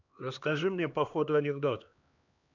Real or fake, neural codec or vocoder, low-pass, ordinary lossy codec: fake; codec, 16 kHz, 2 kbps, X-Codec, HuBERT features, trained on LibriSpeech; 7.2 kHz; none